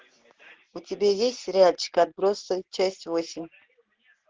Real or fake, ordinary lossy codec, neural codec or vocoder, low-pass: real; Opus, 16 kbps; none; 7.2 kHz